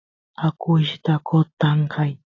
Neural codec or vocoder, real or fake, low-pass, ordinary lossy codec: none; real; 7.2 kHz; AAC, 48 kbps